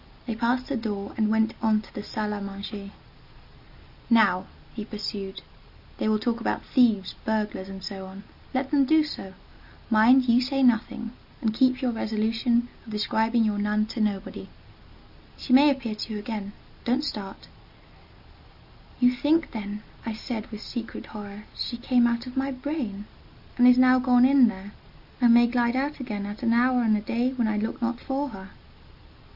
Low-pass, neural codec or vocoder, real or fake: 5.4 kHz; none; real